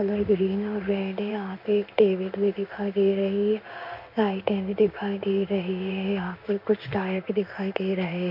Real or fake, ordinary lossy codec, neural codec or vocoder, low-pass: fake; MP3, 48 kbps; codec, 16 kHz in and 24 kHz out, 1 kbps, XY-Tokenizer; 5.4 kHz